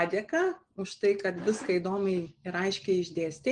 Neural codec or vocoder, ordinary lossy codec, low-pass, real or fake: none; Opus, 24 kbps; 9.9 kHz; real